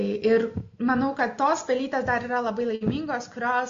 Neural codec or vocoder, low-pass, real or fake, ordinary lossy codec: none; 7.2 kHz; real; AAC, 48 kbps